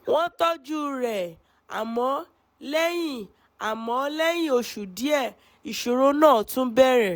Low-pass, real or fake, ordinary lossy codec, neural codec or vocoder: none; real; none; none